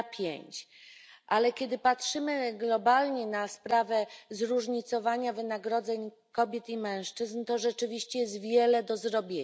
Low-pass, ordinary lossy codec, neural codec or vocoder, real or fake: none; none; none; real